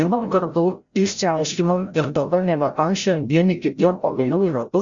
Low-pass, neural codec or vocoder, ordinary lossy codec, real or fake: 7.2 kHz; codec, 16 kHz, 0.5 kbps, FreqCodec, larger model; Opus, 64 kbps; fake